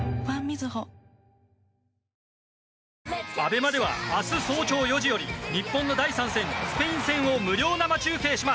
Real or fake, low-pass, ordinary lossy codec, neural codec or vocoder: real; none; none; none